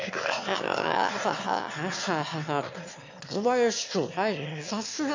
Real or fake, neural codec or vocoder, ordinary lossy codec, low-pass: fake; autoencoder, 22.05 kHz, a latent of 192 numbers a frame, VITS, trained on one speaker; MP3, 48 kbps; 7.2 kHz